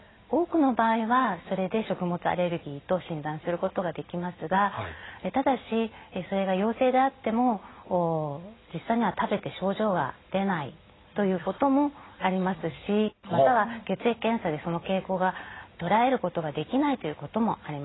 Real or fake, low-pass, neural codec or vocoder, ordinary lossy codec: real; 7.2 kHz; none; AAC, 16 kbps